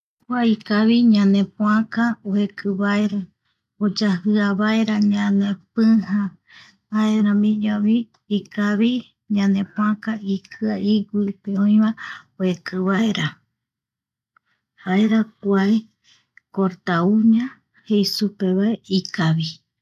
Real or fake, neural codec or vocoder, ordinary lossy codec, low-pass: real; none; none; 14.4 kHz